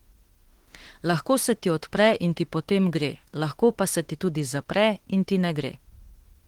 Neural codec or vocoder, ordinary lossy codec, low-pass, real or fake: autoencoder, 48 kHz, 32 numbers a frame, DAC-VAE, trained on Japanese speech; Opus, 16 kbps; 19.8 kHz; fake